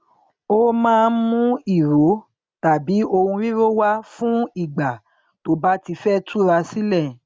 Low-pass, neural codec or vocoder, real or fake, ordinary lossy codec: none; none; real; none